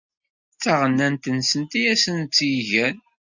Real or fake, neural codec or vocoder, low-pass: real; none; 7.2 kHz